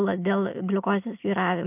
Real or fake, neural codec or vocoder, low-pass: real; none; 3.6 kHz